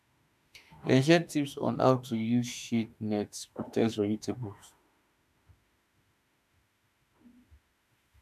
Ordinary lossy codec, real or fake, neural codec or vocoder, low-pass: none; fake; autoencoder, 48 kHz, 32 numbers a frame, DAC-VAE, trained on Japanese speech; 14.4 kHz